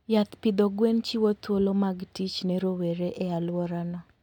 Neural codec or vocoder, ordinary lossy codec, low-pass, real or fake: none; none; 19.8 kHz; real